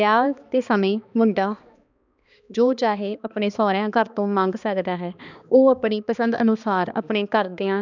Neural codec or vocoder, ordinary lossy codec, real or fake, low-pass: codec, 16 kHz, 2 kbps, X-Codec, HuBERT features, trained on balanced general audio; none; fake; 7.2 kHz